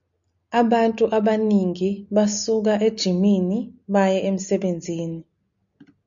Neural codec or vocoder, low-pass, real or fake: none; 7.2 kHz; real